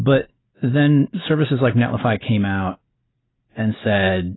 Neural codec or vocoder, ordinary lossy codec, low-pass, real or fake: none; AAC, 16 kbps; 7.2 kHz; real